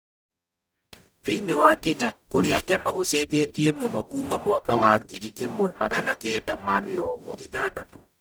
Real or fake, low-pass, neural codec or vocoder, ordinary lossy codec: fake; none; codec, 44.1 kHz, 0.9 kbps, DAC; none